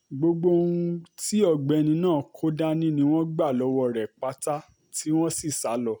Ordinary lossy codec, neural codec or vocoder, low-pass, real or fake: none; none; none; real